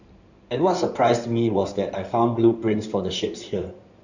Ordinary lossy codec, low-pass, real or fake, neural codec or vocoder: none; 7.2 kHz; fake; codec, 16 kHz in and 24 kHz out, 2.2 kbps, FireRedTTS-2 codec